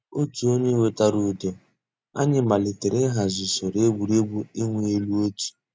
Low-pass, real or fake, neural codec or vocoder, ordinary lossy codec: none; real; none; none